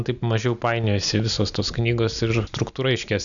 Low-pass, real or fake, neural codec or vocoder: 7.2 kHz; real; none